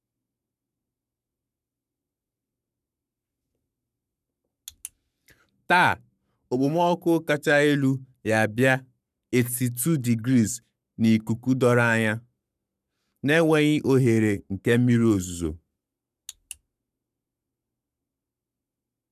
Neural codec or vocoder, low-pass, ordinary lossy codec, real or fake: codec, 44.1 kHz, 7.8 kbps, Pupu-Codec; 14.4 kHz; none; fake